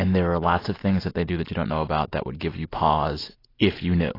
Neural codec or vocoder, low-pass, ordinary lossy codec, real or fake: none; 5.4 kHz; AAC, 24 kbps; real